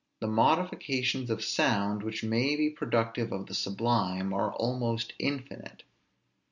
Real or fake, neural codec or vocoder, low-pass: real; none; 7.2 kHz